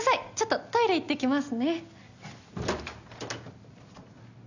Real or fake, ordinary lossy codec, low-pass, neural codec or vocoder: real; none; 7.2 kHz; none